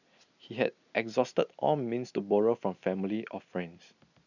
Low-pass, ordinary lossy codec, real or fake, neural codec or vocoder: 7.2 kHz; none; real; none